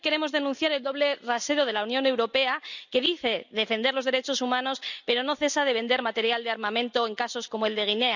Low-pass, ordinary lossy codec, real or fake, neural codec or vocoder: 7.2 kHz; none; real; none